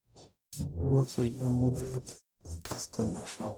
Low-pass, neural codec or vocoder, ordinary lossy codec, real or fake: none; codec, 44.1 kHz, 0.9 kbps, DAC; none; fake